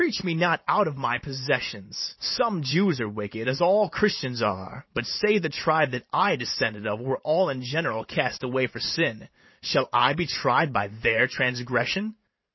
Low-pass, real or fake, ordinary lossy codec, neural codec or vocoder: 7.2 kHz; fake; MP3, 24 kbps; vocoder, 44.1 kHz, 128 mel bands every 512 samples, BigVGAN v2